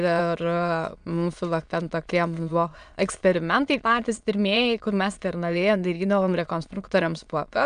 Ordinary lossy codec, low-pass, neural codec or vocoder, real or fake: MP3, 96 kbps; 9.9 kHz; autoencoder, 22.05 kHz, a latent of 192 numbers a frame, VITS, trained on many speakers; fake